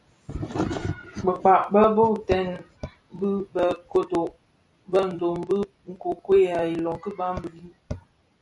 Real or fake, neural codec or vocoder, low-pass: fake; vocoder, 44.1 kHz, 128 mel bands every 256 samples, BigVGAN v2; 10.8 kHz